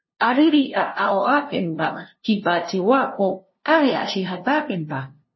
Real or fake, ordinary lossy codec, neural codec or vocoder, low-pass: fake; MP3, 24 kbps; codec, 16 kHz, 0.5 kbps, FunCodec, trained on LibriTTS, 25 frames a second; 7.2 kHz